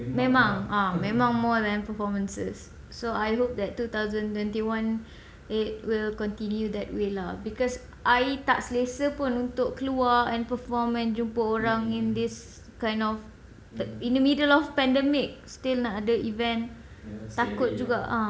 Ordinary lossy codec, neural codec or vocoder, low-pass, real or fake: none; none; none; real